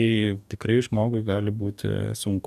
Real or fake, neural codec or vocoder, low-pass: fake; codec, 44.1 kHz, 2.6 kbps, DAC; 14.4 kHz